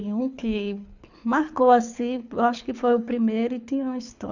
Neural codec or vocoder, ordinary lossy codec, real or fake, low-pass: codec, 24 kHz, 6 kbps, HILCodec; none; fake; 7.2 kHz